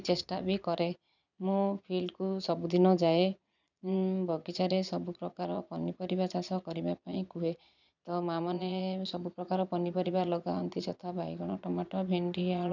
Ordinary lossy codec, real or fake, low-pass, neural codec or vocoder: none; fake; 7.2 kHz; vocoder, 22.05 kHz, 80 mel bands, Vocos